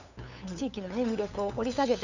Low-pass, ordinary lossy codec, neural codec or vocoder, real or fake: 7.2 kHz; none; codec, 16 kHz, 8 kbps, FunCodec, trained on LibriTTS, 25 frames a second; fake